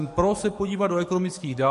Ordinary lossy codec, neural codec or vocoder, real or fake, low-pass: MP3, 48 kbps; codec, 44.1 kHz, 7.8 kbps, DAC; fake; 14.4 kHz